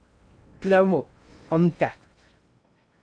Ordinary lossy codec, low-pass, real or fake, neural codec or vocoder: MP3, 96 kbps; 9.9 kHz; fake; codec, 16 kHz in and 24 kHz out, 0.6 kbps, FocalCodec, streaming, 2048 codes